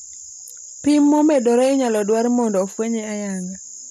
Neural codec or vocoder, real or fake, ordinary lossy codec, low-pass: none; real; none; 14.4 kHz